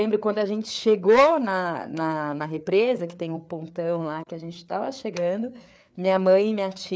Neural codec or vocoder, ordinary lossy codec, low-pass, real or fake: codec, 16 kHz, 4 kbps, FreqCodec, larger model; none; none; fake